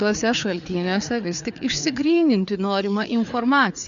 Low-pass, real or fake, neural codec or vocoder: 7.2 kHz; fake; codec, 16 kHz, 4 kbps, FreqCodec, larger model